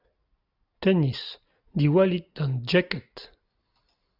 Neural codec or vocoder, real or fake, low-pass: none; real; 5.4 kHz